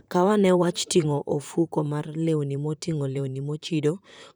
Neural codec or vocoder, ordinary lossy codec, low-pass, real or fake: vocoder, 44.1 kHz, 128 mel bands, Pupu-Vocoder; none; none; fake